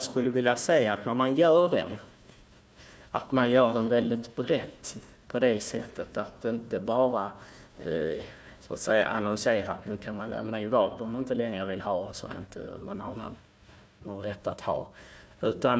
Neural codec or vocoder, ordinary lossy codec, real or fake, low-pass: codec, 16 kHz, 1 kbps, FunCodec, trained on Chinese and English, 50 frames a second; none; fake; none